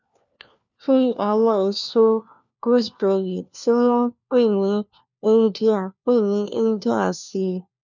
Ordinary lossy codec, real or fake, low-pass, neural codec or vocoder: none; fake; 7.2 kHz; codec, 16 kHz, 1 kbps, FunCodec, trained on LibriTTS, 50 frames a second